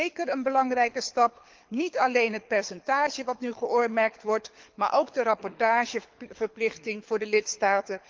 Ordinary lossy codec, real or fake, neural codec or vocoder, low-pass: Opus, 32 kbps; fake; codec, 24 kHz, 6 kbps, HILCodec; 7.2 kHz